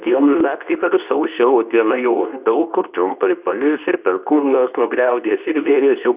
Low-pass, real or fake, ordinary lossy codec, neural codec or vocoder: 3.6 kHz; fake; Opus, 64 kbps; codec, 24 kHz, 0.9 kbps, WavTokenizer, medium speech release version 2